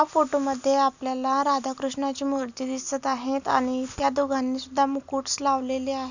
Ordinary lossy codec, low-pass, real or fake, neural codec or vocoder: none; 7.2 kHz; real; none